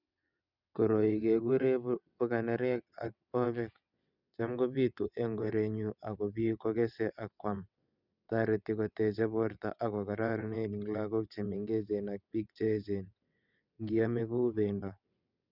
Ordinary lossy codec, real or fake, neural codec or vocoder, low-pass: none; fake; vocoder, 22.05 kHz, 80 mel bands, WaveNeXt; 5.4 kHz